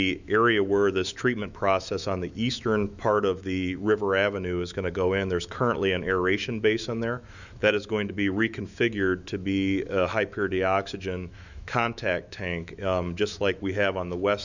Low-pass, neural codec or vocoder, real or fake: 7.2 kHz; autoencoder, 48 kHz, 128 numbers a frame, DAC-VAE, trained on Japanese speech; fake